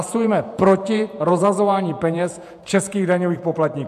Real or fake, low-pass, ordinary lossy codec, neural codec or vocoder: fake; 14.4 kHz; AAC, 96 kbps; vocoder, 48 kHz, 128 mel bands, Vocos